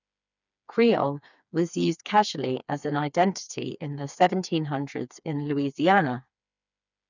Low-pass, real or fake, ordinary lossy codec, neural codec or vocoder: 7.2 kHz; fake; none; codec, 16 kHz, 4 kbps, FreqCodec, smaller model